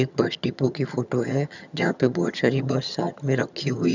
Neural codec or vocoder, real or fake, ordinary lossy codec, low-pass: vocoder, 22.05 kHz, 80 mel bands, HiFi-GAN; fake; none; 7.2 kHz